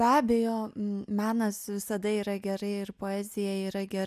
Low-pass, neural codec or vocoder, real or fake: 14.4 kHz; none; real